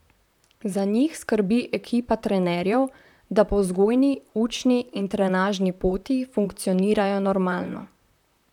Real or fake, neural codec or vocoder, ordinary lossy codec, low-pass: fake; vocoder, 44.1 kHz, 128 mel bands, Pupu-Vocoder; none; 19.8 kHz